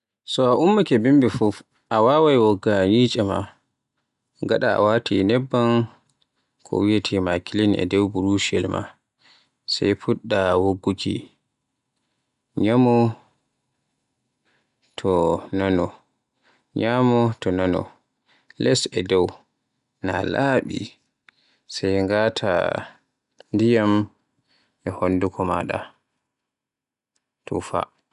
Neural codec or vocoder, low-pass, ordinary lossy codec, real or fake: none; 10.8 kHz; none; real